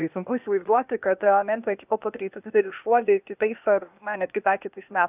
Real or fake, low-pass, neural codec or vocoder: fake; 3.6 kHz; codec, 16 kHz, 0.8 kbps, ZipCodec